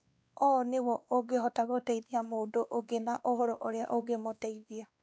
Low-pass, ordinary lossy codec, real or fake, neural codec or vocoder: none; none; fake; codec, 16 kHz, 2 kbps, X-Codec, WavLM features, trained on Multilingual LibriSpeech